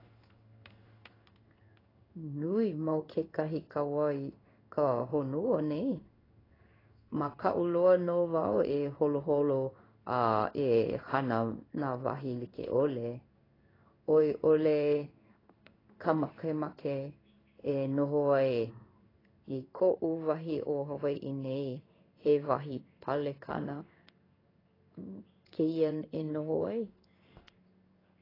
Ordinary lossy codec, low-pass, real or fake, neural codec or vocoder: AAC, 24 kbps; 5.4 kHz; fake; codec, 16 kHz in and 24 kHz out, 1 kbps, XY-Tokenizer